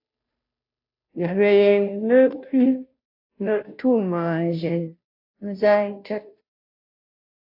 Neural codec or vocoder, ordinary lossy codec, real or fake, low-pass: codec, 16 kHz, 0.5 kbps, FunCodec, trained on Chinese and English, 25 frames a second; AAC, 48 kbps; fake; 5.4 kHz